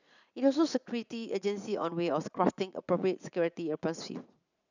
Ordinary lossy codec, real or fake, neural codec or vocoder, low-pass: none; fake; vocoder, 44.1 kHz, 80 mel bands, Vocos; 7.2 kHz